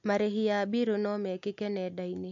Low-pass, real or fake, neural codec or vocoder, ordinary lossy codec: 7.2 kHz; real; none; AAC, 64 kbps